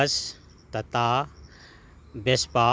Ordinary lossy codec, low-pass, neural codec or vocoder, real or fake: none; none; none; real